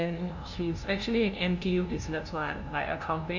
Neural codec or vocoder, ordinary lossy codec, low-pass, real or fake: codec, 16 kHz, 0.5 kbps, FunCodec, trained on LibriTTS, 25 frames a second; none; 7.2 kHz; fake